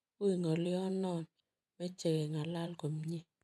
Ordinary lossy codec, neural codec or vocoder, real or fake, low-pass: none; vocoder, 24 kHz, 100 mel bands, Vocos; fake; none